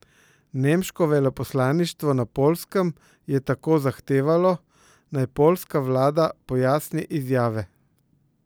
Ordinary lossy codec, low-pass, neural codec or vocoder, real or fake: none; none; none; real